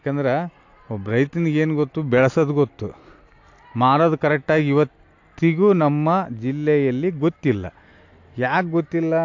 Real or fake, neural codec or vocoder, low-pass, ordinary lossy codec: real; none; 7.2 kHz; AAC, 48 kbps